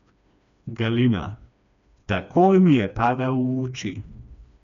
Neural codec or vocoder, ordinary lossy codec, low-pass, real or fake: codec, 16 kHz, 2 kbps, FreqCodec, smaller model; MP3, 96 kbps; 7.2 kHz; fake